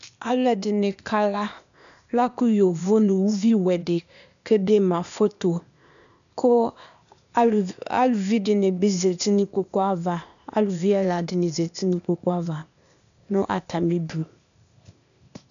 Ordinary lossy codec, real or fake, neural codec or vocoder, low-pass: MP3, 96 kbps; fake; codec, 16 kHz, 0.8 kbps, ZipCodec; 7.2 kHz